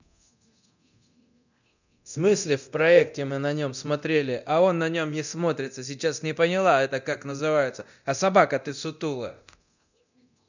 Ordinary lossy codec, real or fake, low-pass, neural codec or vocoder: none; fake; 7.2 kHz; codec, 24 kHz, 0.9 kbps, DualCodec